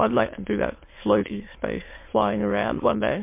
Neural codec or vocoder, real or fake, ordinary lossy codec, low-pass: autoencoder, 22.05 kHz, a latent of 192 numbers a frame, VITS, trained on many speakers; fake; MP3, 24 kbps; 3.6 kHz